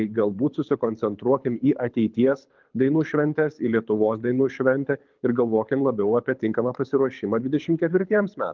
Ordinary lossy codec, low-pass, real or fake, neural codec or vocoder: Opus, 24 kbps; 7.2 kHz; fake; codec, 24 kHz, 6 kbps, HILCodec